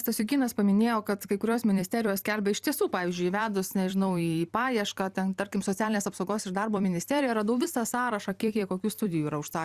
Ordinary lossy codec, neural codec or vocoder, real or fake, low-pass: Opus, 64 kbps; vocoder, 44.1 kHz, 128 mel bands every 256 samples, BigVGAN v2; fake; 14.4 kHz